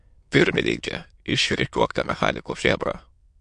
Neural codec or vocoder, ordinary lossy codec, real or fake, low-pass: autoencoder, 22.05 kHz, a latent of 192 numbers a frame, VITS, trained on many speakers; MP3, 64 kbps; fake; 9.9 kHz